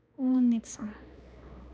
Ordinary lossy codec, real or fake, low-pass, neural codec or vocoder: none; fake; none; codec, 16 kHz, 2 kbps, X-Codec, HuBERT features, trained on balanced general audio